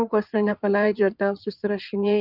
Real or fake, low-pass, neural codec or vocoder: fake; 5.4 kHz; codec, 16 kHz in and 24 kHz out, 2.2 kbps, FireRedTTS-2 codec